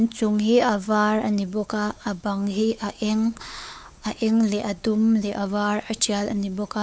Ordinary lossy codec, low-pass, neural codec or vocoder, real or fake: none; none; codec, 16 kHz, 4 kbps, X-Codec, WavLM features, trained on Multilingual LibriSpeech; fake